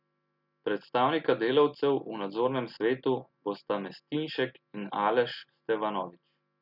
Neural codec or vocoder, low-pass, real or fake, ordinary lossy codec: none; 5.4 kHz; real; none